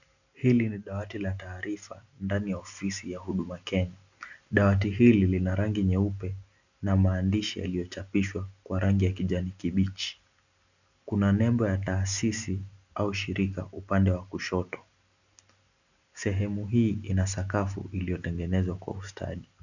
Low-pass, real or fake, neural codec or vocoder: 7.2 kHz; real; none